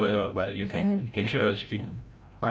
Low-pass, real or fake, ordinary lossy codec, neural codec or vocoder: none; fake; none; codec, 16 kHz, 0.5 kbps, FreqCodec, larger model